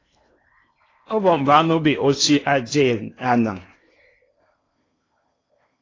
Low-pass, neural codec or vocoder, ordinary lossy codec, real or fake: 7.2 kHz; codec, 16 kHz in and 24 kHz out, 0.8 kbps, FocalCodec, streaming, 65536 codes; AAC, 32 kbps; fake